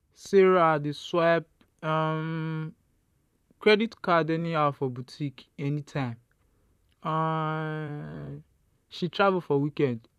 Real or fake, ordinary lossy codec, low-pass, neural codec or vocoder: fake; none; 14.4 kHz; vocoder, 44.1 kHz, 128 mel bands, Pupu-Vocoder